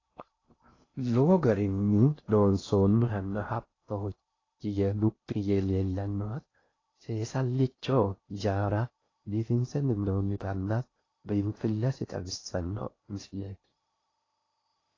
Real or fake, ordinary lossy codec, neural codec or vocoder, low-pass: fake; AAC, 32 kbps; codec, 16 kHz in and 24 kHz out, 0.6 kbps, FocalCodec, streaming, 2048 codes; 7.2 kHz